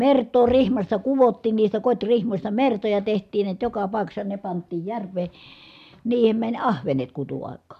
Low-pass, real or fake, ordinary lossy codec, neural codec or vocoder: 14.4 kHz; real; MP3, 96 kbps; none